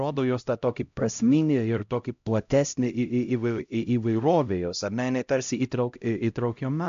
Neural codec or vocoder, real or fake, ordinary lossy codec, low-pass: codec, 16 kHz, 0.5 kbps, X-Codec, HuBERT features, trained on LibriSpeech; fake; AAC, 64 kbps; 7.2 kHz